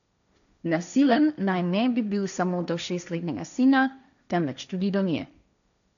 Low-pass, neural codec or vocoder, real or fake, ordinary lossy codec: 7.2 kHz; codec, 16 kHz, 1.1 kbps, Voila-Tokenizer; fake; none